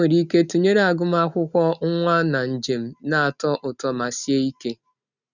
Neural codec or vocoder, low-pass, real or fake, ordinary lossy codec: none; 7.2 kHz; real; none